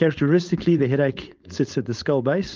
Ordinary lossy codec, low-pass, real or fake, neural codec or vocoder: Opus, 32 kbps; 7.2 kHz; fake; codec, 16 kHz, 4.8 kbps, FACodec